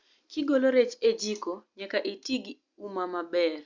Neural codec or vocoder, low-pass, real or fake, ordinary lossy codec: none; 7.2 kHz; real; Opus, 64 kbps